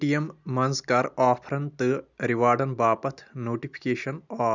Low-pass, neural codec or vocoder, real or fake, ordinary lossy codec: 7.2 kHz; none; real; none